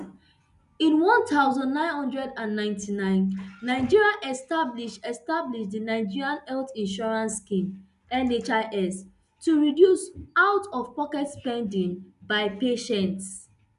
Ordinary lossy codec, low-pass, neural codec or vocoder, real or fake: none; 10.8 kHz; none; real